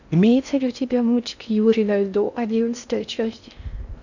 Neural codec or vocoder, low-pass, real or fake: codec, 16 kHz in and 24 kHz out, 0.6 kbps, FocalCodec, streaming, 4096 codes; 7.2 kHz; fake